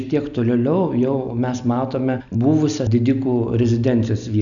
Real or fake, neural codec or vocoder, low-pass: real; none; 7.2 kHz